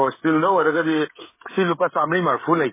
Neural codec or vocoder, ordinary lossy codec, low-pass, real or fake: codec, 16 kHz, 16 kbps, FreqCodec, smaller model; MP3, 16 kbps; 3.6 kHz; fake